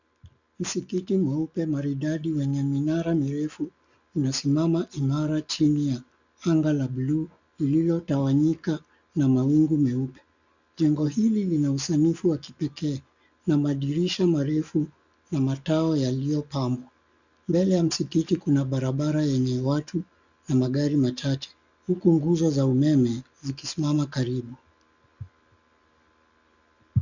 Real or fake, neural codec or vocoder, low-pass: real; none; 7.2 kHz